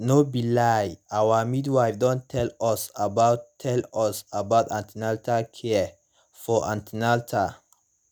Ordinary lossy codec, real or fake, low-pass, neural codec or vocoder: none; real; none; none